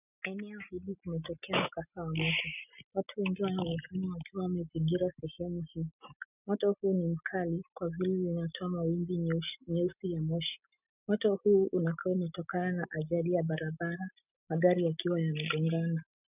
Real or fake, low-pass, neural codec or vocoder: real; 3.6 kHz; none